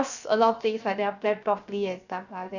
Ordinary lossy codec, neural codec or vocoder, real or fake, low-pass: none; codec, 16 kHz, 0.7 kbps, FocalCodec; fake; 7.2 kHz